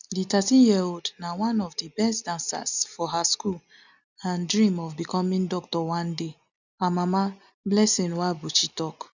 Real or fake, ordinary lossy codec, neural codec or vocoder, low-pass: real; none; none; 7.2 kHz